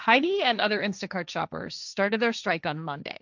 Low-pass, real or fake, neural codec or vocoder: 7.2 kHz; fake; codec, 16 kHz, 1.1 kbps, Voila-Tokenizer